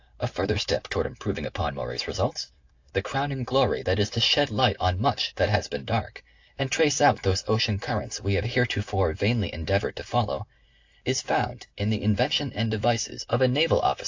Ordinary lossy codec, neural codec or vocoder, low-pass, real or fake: AAC, 48 kbps; none; 7.2 kHz; real